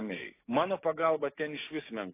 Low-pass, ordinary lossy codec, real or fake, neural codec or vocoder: 3.6 kHz; AAC, 24 kbps; fake; codec, 16 kHz, 6 kbps, DAC